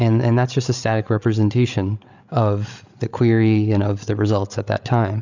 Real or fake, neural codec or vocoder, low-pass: fake; codec, 16 kHz, 8 kbps, FreqCodec, larger model; 7.2 kHz